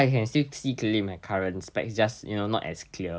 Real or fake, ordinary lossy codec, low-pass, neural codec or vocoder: real; none; none; none